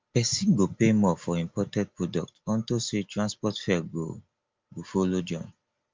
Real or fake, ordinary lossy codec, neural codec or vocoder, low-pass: real; Opus, 24 kbps; none; 7.2 kHz